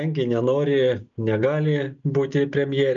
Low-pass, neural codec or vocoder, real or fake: 7.2 kHz; none; real